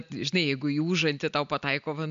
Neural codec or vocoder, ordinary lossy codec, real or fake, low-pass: none; MP3, 64 kbps; real; 7.2 kHz